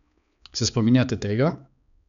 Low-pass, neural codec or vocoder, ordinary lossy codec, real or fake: 7.2 kHz; codec, 16 kHz, 4 kbps, X-Codec, HuBERT features, trained on balanced general audio; none; fake